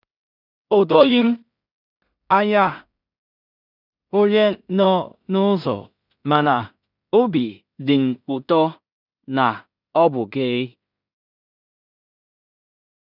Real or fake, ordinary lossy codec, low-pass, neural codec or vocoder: fake; none; 5.4 kHz; codec, 16 kHz in and 24 kHz out, 0.4 kbps, LongCat-Audio-Codec, two codebook decoder